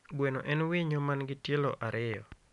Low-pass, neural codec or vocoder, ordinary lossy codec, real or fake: 10.8 kHz; none; none; real